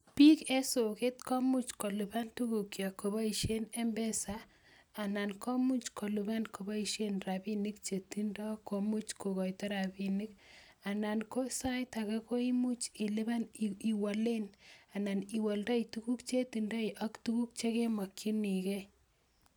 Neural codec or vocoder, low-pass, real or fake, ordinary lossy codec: none; none; real; none